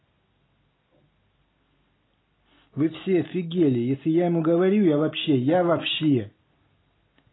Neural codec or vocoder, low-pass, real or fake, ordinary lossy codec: none; 7.2 kHz; real; AAC, 16 kbps